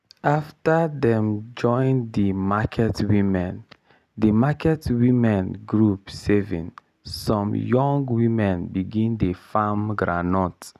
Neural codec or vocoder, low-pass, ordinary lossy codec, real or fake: none; 14.4 kHz; none; real